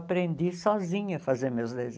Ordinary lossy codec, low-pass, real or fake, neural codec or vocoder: none; none; real; none